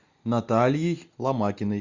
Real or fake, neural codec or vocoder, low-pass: real; none; 7.2 kHz